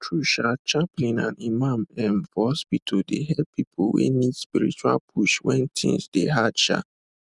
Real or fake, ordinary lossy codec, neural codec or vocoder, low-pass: real; none; none; 10.8 kHz